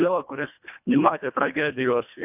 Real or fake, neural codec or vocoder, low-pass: fake; codec, 24 kHz, 1.5 kbps, HILCodec; 3.6 kHz